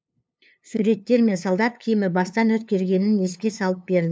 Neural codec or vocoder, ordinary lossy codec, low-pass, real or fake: codec, 16 kHz, 2 kbps, FunCodec, trained on LibriTTS, 25 frames a second; none; none; fake